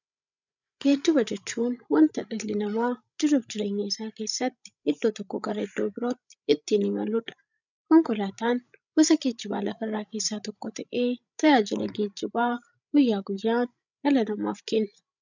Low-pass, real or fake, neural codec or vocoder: 7.2 kHz; fake; codec, 16 kHz, 16 kbps, FreqCodec, larger model